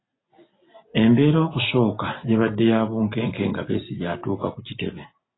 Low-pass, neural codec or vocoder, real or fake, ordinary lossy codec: 7.2 kHz; none; real; AAC, 16 kbps